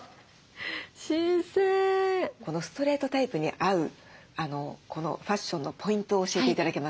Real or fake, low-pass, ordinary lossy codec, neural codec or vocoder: real; none; none; none